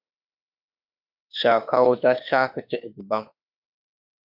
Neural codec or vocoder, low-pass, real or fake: autoencoder, 48 kHz, 32 numbers a frame, DAC-VAE, trained on Japanese speech; 5.4 kHz; fake